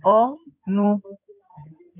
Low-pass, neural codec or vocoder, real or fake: 3.6 kHz; codec, 16 kHz in and 24 kHz out, 2.2 kbps, FireRedTTS-2 codec; fake